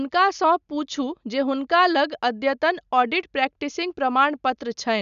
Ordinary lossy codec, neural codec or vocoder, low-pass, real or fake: none; none; 7.2 kHz; real